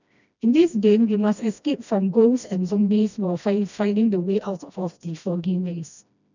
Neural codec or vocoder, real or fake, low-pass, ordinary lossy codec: codec, 16 kHz, 1 kbps, FreqCodec, smaller model; fake; 7.2 kHz; none